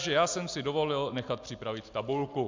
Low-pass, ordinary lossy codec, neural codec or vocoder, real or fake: 7.2 kHz; MP3, 64 kbps; none; real